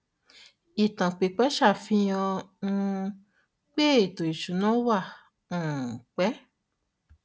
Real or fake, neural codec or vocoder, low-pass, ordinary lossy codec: real; none; none; none